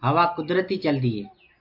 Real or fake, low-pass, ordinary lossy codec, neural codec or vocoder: real; 5.4 kHz; MP3, 48 kbps; none